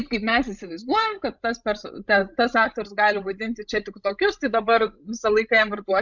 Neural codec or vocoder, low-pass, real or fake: codec, 16 kHz, 16 kbps, FreqCodec, larger model; 7.2 kHz; fake